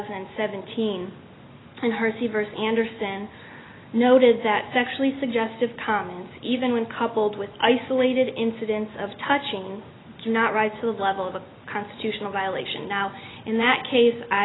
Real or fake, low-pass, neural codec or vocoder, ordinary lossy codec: real; 7.2 kHz; none; AAC, 16 kbps